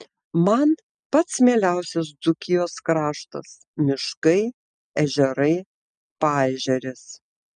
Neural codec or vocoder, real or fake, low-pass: none; real; 9.9 kHz